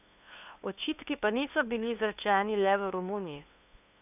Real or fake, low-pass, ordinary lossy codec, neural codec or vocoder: fake; 3.6 kHz; none; codec, 16 kHz, 2 kbps, FunCodec, trained on LibriTTS, 25 frames a second